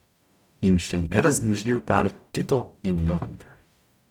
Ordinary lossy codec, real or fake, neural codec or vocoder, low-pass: none; fake; codec, 44.1 kHz, 0.9 kbps, DAC; 19.8 kHz